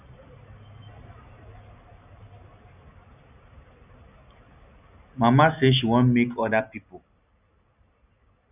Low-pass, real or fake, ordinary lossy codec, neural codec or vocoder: 3.6 kHz; real; none; none